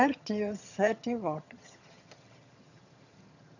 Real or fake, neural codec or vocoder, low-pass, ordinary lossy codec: fake; vocoder, 22.05 kHz, 80 mel bands, HiFi-GAN; 7.2 kHz; none